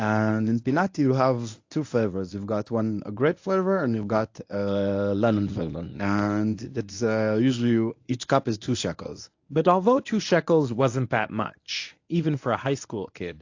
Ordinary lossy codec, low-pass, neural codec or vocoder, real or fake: AAC, 48 kbps; 7.2 kHz; codec, 24 kHz, 0.9 kbps, WavTokenizer, medium speech release version 1; fake